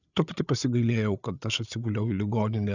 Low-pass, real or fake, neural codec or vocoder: 7.2 kHz; fake; codec, 16 kHz, 8 kbps, FreqCodec, larger model